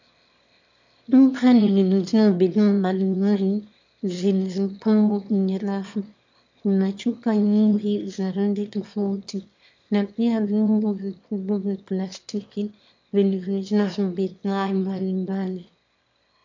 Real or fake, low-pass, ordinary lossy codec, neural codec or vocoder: fake; 7.2 kHz; MP3, 64 kbps; autoencoder, 22.05 kHz, a latent of 192 numbers a frame, VITS, trained on one speaker